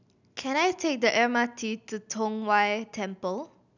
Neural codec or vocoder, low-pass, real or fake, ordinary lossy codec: none; 7.2 kHz; real; none